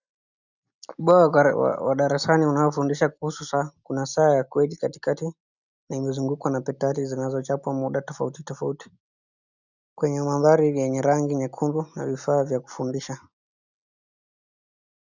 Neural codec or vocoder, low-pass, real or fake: none; 7.2 kHz; real